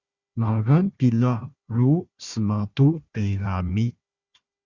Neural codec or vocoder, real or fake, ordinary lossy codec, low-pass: codec, 16 kHz, 1 kbps, FunCodec, trained on Chinese and English, 50 frames a second; fake; Opus, 64 kbps; 7.2 kHz